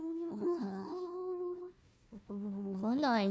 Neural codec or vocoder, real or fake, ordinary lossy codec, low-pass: codec, 16 kHz, 1 kbps, FunCodec, trained on Chinese and English, 50 frames a second; fake; none; none